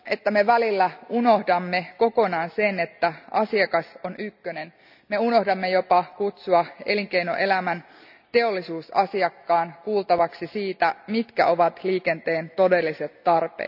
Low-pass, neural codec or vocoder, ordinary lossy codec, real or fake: 5.4 kHz; none; none; real